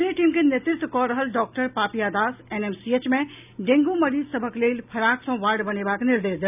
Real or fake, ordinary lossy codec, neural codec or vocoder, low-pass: real; none; none; 3.6 kHz